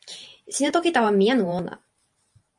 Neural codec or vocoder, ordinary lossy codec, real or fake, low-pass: vocoder, 44.1 kHz, 128 mel bands every 512 samples, BigVGAN v2; MP3, 48 kbps; fake; 10.8 kHz